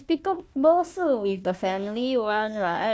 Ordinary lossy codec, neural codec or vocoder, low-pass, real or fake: none; codec, 16 kHz, 1 kbps, FunCodec, trained on Chinese and English, 50 frames a second; none; fake